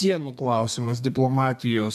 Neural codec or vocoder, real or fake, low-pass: codec, 32 kHz, 1.9 kbps, SNAC; fake; 14.4 kHz